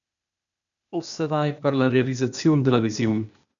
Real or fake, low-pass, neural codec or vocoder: fake; 7.2 kHz; codec, 16 kHz, 0.8 kbps, ZipCodec